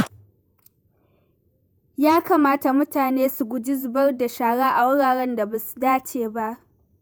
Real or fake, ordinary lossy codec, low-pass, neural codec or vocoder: fake; none; none; vocoder, 48 kHz, 128 mel bands, Vocos